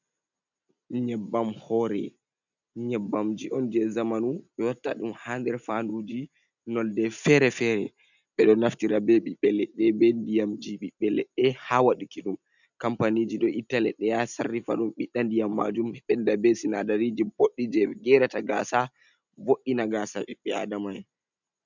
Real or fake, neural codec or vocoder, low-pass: real; none; 7.2 kHz